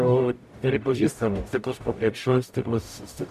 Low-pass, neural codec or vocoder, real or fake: 14.4 kHz; codec, 44.1 kHz, 0.9 kbps, DAC; fake